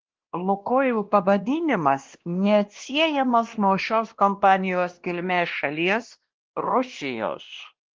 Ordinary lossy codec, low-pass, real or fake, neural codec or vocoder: Opus, 16 kbps; 7.2 kHz; fake; codec, 16 kHz, 1 kbps, X-Codec, WavLM features, trained on Multilingual LibriSpeech